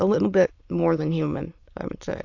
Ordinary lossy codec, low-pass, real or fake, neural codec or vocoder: AAC, 48 kbps; 7.2 kHz; fake; autoencoder, 22.05 kHz, a latent of 192 numbers a frame, VITS, trained on many speakers